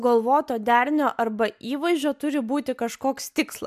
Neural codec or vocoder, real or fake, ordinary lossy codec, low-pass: none; real; MP3, 96 kbps; 14.4 kHz